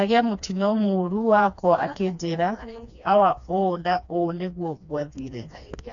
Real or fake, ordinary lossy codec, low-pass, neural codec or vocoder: fake; AAC, 64 kbps; 7.2 kHz; codec, 16 kHz, 2 kbps, FreqCodec, smaller model